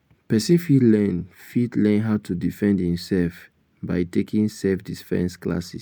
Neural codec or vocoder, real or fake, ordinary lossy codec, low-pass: none; real; none; none